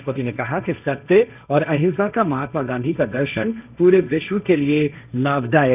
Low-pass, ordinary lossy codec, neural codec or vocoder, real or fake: 3.6 kHz; none; codec, 16 kHz, 1.1 kbps, Voila-Tokenizer; fake